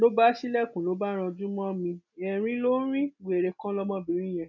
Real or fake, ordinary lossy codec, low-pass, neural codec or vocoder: real; none; 7.2 kHz; none